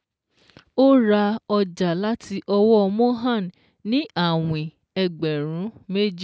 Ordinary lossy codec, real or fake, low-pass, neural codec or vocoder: none; real; none; none